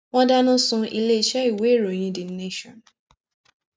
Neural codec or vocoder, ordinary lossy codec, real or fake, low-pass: none; none; real; none